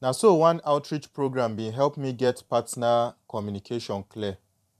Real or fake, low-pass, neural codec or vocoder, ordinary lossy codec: fake; 14.4 kHz; vocoder, 44.1 kHz, 128 mel bands every 512 samples, BigVGAN v2; none